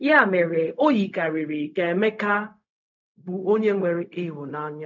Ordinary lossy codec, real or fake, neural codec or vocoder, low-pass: none; fake; codec, 16 kHz, 0.4 kbps, LongCat-Audio-Codec; 7.2 kHz